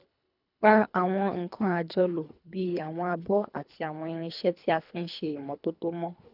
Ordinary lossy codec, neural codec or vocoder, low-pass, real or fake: none; codec, 24 kHz, 3 kbps, HILCodec; 5.4 kHz; fake